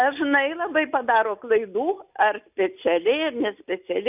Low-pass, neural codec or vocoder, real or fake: 3.6 kHz; none; real